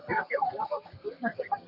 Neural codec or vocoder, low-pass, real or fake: codec, 32 kHz, 1.9 kbps, SNAC; 5.4 kHz; fake